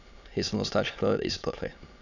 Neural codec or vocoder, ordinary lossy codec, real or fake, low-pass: autoencoder, 22.05 kHz, a latent of 192 numbers a frame, VITS, trained on many speakers; none; fake; 7.2 kHz